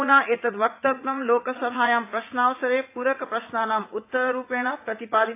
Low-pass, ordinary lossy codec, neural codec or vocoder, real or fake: 3.6 kHz; AAC, 24 kbps; vocoder, 44.1 kHz, 80 mel bands, Vocos; fake